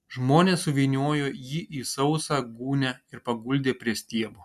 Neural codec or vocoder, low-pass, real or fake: none; 14.4 kHz; real